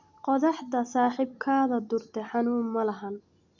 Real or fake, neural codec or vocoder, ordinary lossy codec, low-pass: real; none; none; 7.2 kHz